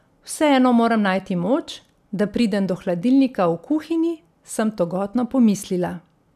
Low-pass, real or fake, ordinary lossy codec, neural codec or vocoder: 14.4 kHz; real; none; none